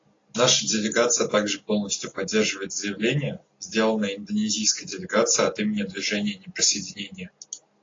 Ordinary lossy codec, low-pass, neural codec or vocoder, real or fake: AAC, 32 kbps; 7.2 kHz; none; real